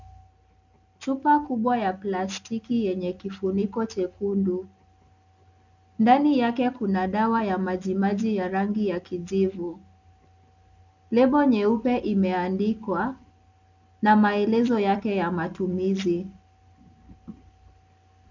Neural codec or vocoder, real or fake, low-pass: none; real; 7.2 kHz